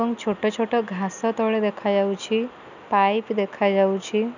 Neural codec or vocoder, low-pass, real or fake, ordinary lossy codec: none; 7.2 kHz; real; none